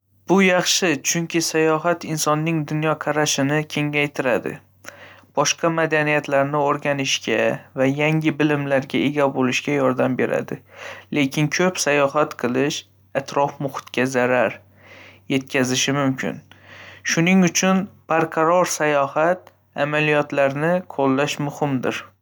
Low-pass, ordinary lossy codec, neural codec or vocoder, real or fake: none; none; none; real